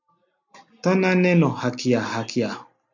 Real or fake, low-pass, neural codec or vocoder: real; 7.2 kHz; none